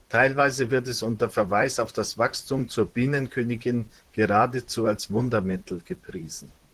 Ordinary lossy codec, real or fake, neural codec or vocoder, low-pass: Opus, 16 kbps; fake; vocoder, 44.1 kHz, 128 mel bands, Pupu-Vocoder; 14.4 kHz